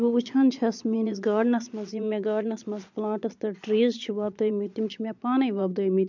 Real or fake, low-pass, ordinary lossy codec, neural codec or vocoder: fake; 7.2 kHz; none; vocoder, 22.05 kHz, 80 mel bands, Vocos